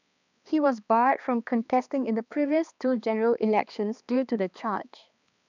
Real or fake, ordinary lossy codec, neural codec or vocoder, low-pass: fake; none; codec, 16 kHz, 2 kbps, X-Codec, HuBERT features, trained on balanced general audio; 7.2 kHz